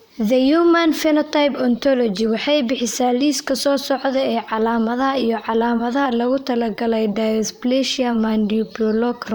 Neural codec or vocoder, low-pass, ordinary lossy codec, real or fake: vocoder, 44.1 kHz, 128 mel bands, Pupu-Vocoder; none; none; fake